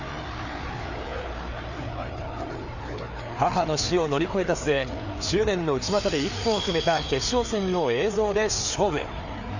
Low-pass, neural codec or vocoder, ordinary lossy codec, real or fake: 7.2 kHz; codec, 16 kHz, 4 kbps, FreqCodec, larger model; none; fake